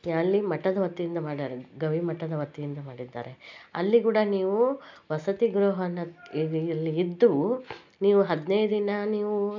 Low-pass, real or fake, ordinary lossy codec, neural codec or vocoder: 7.2 kHz; real; MP3, 64 kbps; none